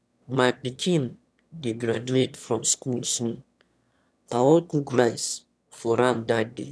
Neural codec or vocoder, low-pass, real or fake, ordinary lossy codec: autoencoder, 22.05 kHz, a latent of 192 numbers a frame, VITS, trained on one speaker; none; fake; none